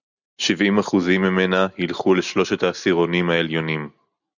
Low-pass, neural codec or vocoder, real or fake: 7.2 kHz; none; real